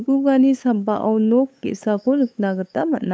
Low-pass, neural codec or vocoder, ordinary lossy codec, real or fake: none; codec, 16 kHz, 8 kbps, FunCodec, trained on LibriTTS, 25 frames a second; none; fake